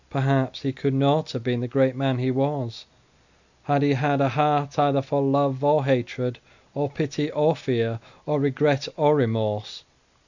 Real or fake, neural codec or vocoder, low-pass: real; none; 7.2 kHz